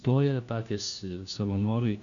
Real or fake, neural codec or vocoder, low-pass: fake; codec, 16 kHz, 1 kbps, FunCodec, trained on LibriTTS, 50 frames a second; 7.2 kHz